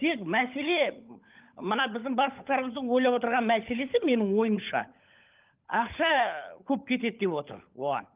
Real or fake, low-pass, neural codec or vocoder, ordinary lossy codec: fake; 3.6 kHz; codec, 16 kHz, 16 kbps, FunCodec, trained on LibriTTS, 50 frames a second; Opus, 24 kbps